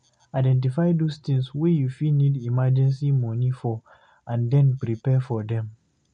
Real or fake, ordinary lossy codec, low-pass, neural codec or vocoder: real; MP3, 64 kbps; 9.9 kHz; none